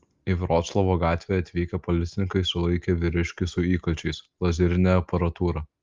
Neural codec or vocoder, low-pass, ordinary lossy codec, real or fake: none; 7.2 kHz; Opus, 32 kbps; real